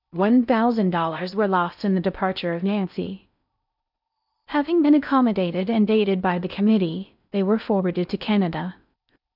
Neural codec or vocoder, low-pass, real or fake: codec, 16 kHz in and 24 kHz out, 0.6 kbps, FocalCodec, streaming, 4096 codes; 5.4 kHz; fake